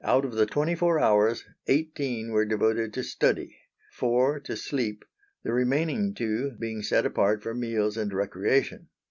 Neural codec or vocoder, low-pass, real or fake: none; 7.2 kHz; real